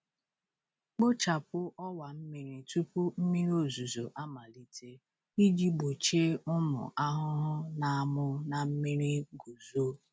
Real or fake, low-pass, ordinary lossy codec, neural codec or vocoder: real; none; none; none